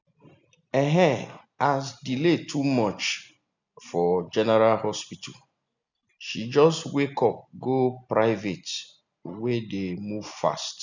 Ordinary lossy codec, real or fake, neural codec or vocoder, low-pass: none; real; none; 7.2 kHz